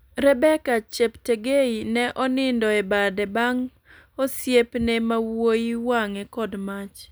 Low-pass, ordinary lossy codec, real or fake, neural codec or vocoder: none; none; real; none